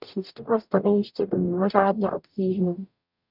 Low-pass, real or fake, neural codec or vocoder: 5.4 kHz; fake; codec, 44.1 kHz, 0.9 kbps, DAC